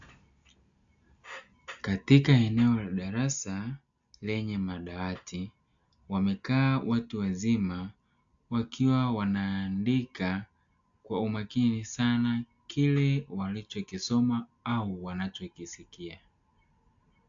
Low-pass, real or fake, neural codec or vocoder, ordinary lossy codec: 7.2 kHz; real; none; AAC, 64 kbps